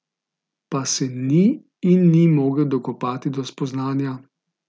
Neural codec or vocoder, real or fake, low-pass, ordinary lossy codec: none; real; none; none